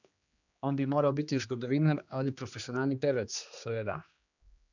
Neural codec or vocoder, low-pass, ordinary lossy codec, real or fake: codec, 16 kHz, 2 kbps, X-Codec, HuBERT features, trained on general audio; 7.2 kHz; none; fake